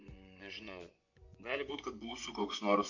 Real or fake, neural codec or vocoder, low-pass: fake; vocoder, 24 kHz, 100 mel bands, Vocos; 7.2 kHz